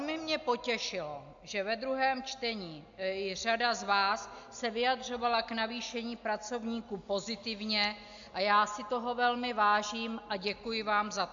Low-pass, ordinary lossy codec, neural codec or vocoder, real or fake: 7.2 kHz; AAC, 64 kbps; none; real